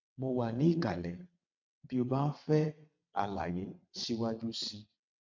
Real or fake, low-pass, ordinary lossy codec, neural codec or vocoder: fake; 7.2 kHz; MP3, 64 kbps; vocoder, 22.05 kHz, 80 mel bands, WaveNeXt